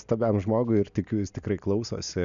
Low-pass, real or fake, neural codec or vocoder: 7.2 kHz; real; none